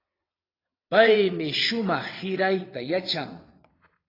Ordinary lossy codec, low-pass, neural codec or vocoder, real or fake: AAC, 24 kbps; 5.4 kHz; vocoder, 22.05 kHz, 80 mel bands, WaveNeXt; fake